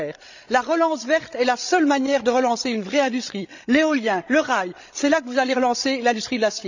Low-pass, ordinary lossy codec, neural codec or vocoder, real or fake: 7.2 kHz; none; codec, 16 kHz, 16 kbps, FreqCodec, larger model; fake